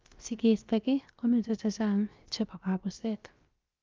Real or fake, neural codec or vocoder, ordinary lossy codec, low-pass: fake; codec, 16 kHz, about 1 kbps, DyCAST, with the encoder's durations; Opus, 24 kbps; 7.2 kHz